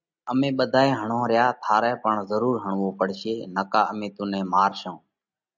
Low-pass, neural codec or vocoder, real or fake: 7.2 kHz; none; real